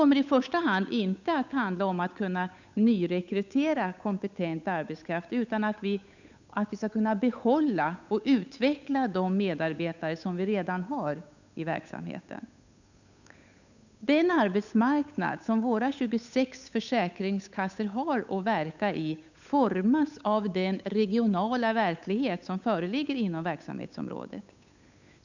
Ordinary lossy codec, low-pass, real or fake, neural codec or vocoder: none; 7.2 kHz; fake; codec, 16 kHz, 8 kbps, FunCodec, trained on Chinese and English, 25 frames a second